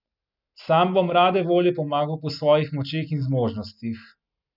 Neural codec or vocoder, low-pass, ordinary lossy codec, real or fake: none; 5.4 kHz; none; real